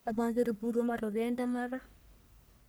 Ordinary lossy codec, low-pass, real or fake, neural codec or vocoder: none; none; fake; codec, 44.1 kHz, 1.7 kbps, Pupu-Codec